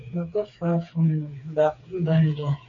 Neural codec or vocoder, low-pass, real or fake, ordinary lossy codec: codec, 16 kHz, 4 kbps, FreqCodec, smaller model; 7.2 kHz; fake; AAC, 48 kbps